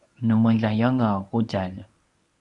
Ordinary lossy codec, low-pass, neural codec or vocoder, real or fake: MP3, 96 kbps; 10.8 kHz; codec, 24 kHz, 0.9 kbps, WavTokenizer, medium speech release version 1; fake